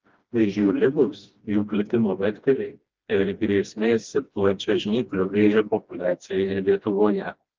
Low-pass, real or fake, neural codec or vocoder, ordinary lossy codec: 7.2 kHz; fake; codec, 16 kHz, 1 kbps, FreqCodec, smaller model; Opus, 32 kbps